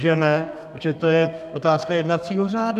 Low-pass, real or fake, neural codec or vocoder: 14.4 kHz; fake; codec, 32 kHz, 1.9 kbps, SNAC